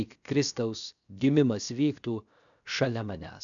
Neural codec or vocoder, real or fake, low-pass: codec, 16 kHz, 0.7 kbps, FocalCodec; fake; 7.2 kHz